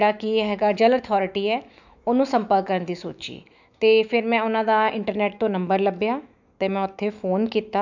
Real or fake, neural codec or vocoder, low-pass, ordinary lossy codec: fake; autoencoder, 48 kHz, 128 numbers a frame, DAC-VAE, trained on Japanese speech; 7.2 kHz; none